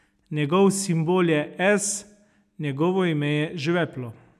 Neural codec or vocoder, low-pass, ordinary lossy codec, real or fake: none; 14.4 kHz; none; real